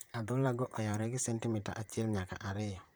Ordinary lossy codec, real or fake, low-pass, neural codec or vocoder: none; fake; none; vocoder, 44.1 kHz, 128 mel bands, Pupu-Vocoder